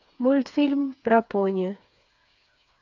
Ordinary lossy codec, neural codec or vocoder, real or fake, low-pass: none; codec, 16 kHz, 4 kbps, FreqCodec, smaller model; fake; 7.2 kHz